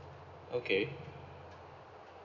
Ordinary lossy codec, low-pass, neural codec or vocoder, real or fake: none; 7.2 kHz; none; real